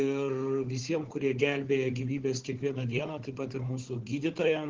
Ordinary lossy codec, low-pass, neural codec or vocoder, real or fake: Opus, 16 kbps; 7.2 kHz; codec, 16 kHz, 4 kbps, FunCodec, trained on Chinese and English, 50 frames a second; fake